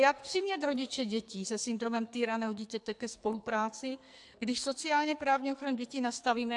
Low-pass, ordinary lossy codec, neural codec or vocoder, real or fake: 10.8 kHz; AAC, 64 kbps; codec, 44.1 kHz, 2.6 kbps, SNAC; fake